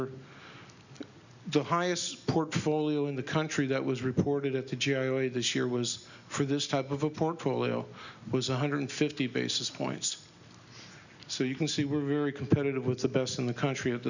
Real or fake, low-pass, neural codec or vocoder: real; 7.2 kHz; none